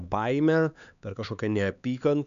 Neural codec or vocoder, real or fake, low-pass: codec, 16 kHz, 4 kbps, X-Codec, HuBERT features, trained on LibriSpeech; fake; 7.2 kHz